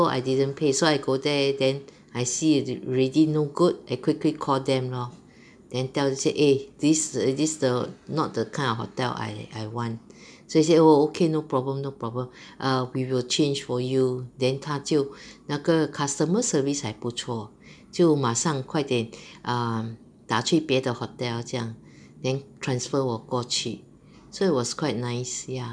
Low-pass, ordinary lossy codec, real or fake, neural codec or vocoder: 9.9 kHz; none; real; none